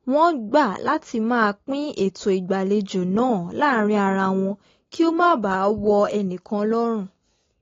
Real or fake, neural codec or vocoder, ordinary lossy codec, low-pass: real; none; AAC, 32 kbps; 7.2 kHz